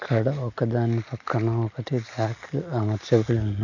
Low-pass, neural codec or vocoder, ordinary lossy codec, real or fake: 7.2 kHz; none; none; real